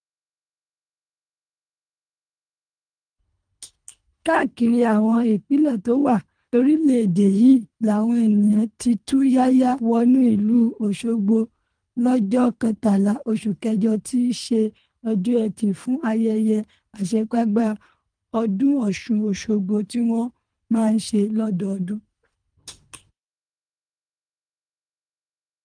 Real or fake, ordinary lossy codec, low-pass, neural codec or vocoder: fake; Opus, 32 kbps; 9.9 kHz; codec, 24 kHz, 3 kbps, HILCodec